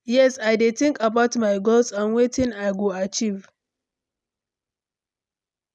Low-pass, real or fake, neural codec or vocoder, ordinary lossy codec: none; real; none; none